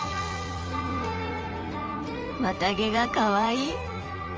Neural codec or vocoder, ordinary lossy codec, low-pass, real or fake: vocoder, 44.1 kHz, 80 mel bands, Vocos; Opus, 24 kbps; 7.2 kHz; fake